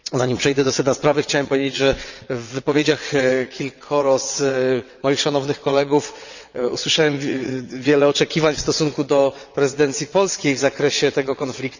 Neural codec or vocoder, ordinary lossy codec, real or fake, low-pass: vocoder, 22.05 kHz, 80 mel bands, WaveNeXt; none; fake; 7.2 kHz